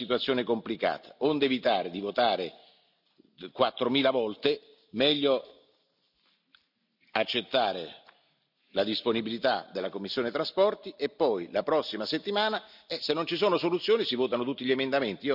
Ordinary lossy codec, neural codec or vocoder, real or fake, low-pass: none; none; real; 5.4 kHz